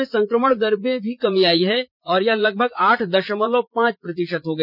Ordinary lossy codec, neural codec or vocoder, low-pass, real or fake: none; vocoder, 44.1 kHz, 80 mel bands, Vocos; 5.4 kHz; fake